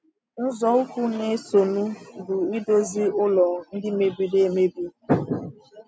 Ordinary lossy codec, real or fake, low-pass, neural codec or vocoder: none; real; none; none